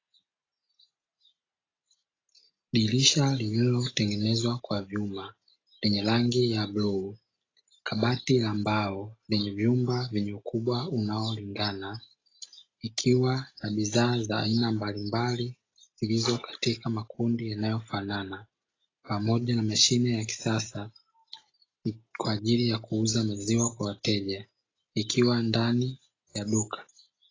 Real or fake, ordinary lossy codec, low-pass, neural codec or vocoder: real; AAC, 32 kbps; 7.2 kHz; none